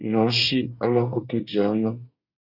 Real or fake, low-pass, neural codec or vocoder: fake; 5.4 kHz; codec, 24 kHz, 1 kbps, SNAC